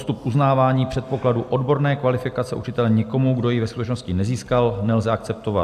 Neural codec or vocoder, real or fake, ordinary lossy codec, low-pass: none; real; AAC, 96 kbps; 14.4 kHz